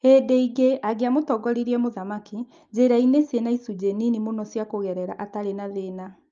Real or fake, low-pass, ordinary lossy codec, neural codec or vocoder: real; 7.2 kHz; Opus, 24 kbps; none